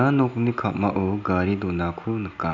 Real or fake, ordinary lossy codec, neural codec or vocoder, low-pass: real; none; none; 7.2 kHz